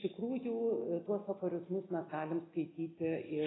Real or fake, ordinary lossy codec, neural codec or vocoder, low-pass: real; AAC, 16 kbps; none; 7.2 kHz